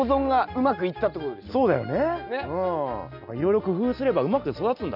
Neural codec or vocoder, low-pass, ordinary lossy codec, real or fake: none; 5.4 kHz; none; real